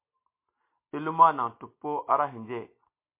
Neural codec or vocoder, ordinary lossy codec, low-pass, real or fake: none; MP3, 24 kbps; 3.6 kHz; real